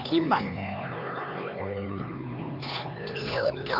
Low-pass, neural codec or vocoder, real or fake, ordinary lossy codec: 5.4 kHz; codec, 16 kHz, 4 kbps, X-Codec, HuBERT features, trained on LibriSpeech; fake; none